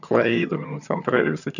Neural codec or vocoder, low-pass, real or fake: vocoder, 22.05 kHz, 80 mel bands, HiFi-GAN; 7.2 kHz; fake